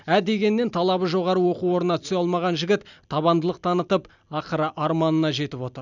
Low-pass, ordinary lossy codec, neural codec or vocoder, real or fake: 7.2 kHz; none; none; real